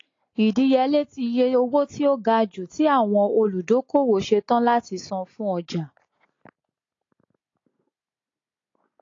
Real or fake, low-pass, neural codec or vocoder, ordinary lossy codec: real; 7.2 kHz; none; AAC, 32 kbps